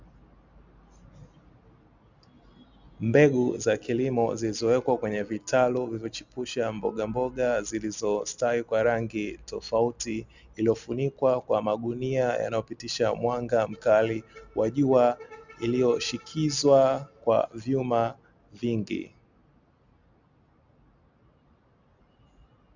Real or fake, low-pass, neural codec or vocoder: real; 7.2 kHz; none